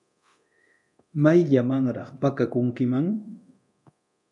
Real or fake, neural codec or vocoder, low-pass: fake; codec, 24 kHz, 0.9 kbps, DualCodec; 10.8 kHz